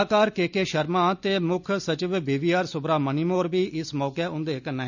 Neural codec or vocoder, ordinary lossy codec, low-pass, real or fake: none; none; 7.2 kHz; real